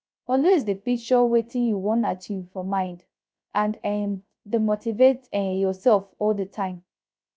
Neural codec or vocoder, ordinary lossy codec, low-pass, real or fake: codec, 16 kHz, 0.3 kbps, FocalCodec; none; none; fake